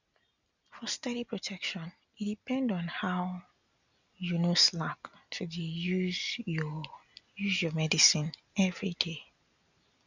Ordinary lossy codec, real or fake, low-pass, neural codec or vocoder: none; real; 7.2 kHz; none